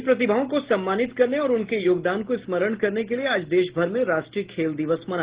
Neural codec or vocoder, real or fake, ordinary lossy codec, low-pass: none; real; Opus, 16 kbps; 3.6 kHz